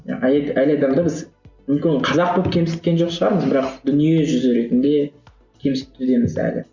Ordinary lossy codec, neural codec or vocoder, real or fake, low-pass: Opus, 64 kbps; none; real; 7.2 kHz